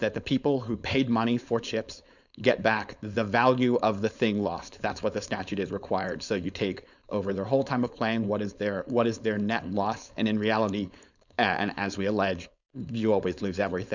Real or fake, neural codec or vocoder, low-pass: fake; codec, 16 kHz, 4.8 kbps, FACodec; 7.2 kHz